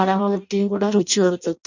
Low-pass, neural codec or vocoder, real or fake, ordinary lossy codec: 7.2 kHz; codec, 16 kHz in and 24 kHz out, 0.6 kbps, FireRedTTS-2 codec; fake; none